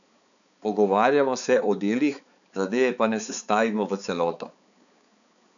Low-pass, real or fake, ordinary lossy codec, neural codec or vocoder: 7.2 kHz; fake; none; codec, 16 kHz, 4 kbps, X-Codec, HuBERT features, trained on balanced general audio